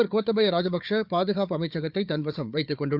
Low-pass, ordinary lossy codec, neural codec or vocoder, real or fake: 5.4 kHz; none; codec, 16 kHz, 4 kbps, FunCodec, trained on Chinese and English, 50 frames a second; fake